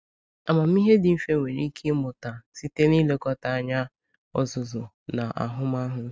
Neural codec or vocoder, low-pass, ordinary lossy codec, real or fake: none; none; none; real